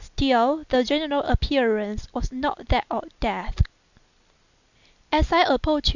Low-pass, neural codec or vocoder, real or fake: 7.2 kHz; none; real